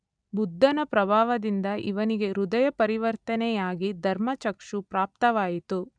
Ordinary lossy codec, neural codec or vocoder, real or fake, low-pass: none; none; real; 9.9 kHz